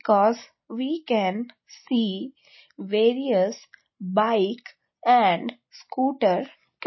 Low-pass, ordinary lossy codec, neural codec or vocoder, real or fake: 7.2 kHz; MP3, 24 kbps; none; real